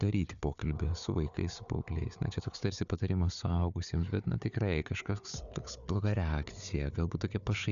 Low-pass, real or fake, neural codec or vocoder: 7.2 kHz; fake; codec, 16 kHz, 4 kbps, FunCodec, trained on Chinese and English, 50 frames a second